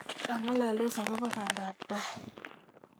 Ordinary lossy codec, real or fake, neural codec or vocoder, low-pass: none; fake; codec, 44.1 kHz, 3.4 kbps, Pupu-Codec; none